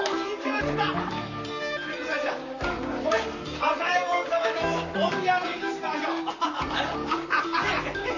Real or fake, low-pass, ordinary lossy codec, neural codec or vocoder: fake; 7.2 kHz; none; vocoder, 44.1 kHz, 128 mel bands, Pupu-Vocoder